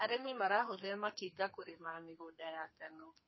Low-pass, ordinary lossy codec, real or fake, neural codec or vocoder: 7.2 kHz; MP3, 24 kbps; fake; codec, 32 kHz, 1.9 kbps, SNAC